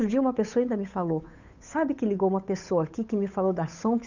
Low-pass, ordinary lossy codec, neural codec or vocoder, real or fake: 7.2 kHz; none; codec, 16 kHz, 8 kbps, FunCodec, trained on Chinese and English, 25 frames a second; fake